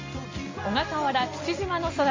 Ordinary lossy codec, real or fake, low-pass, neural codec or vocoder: MP3, 32 kbps; real; 7.2 kHz; none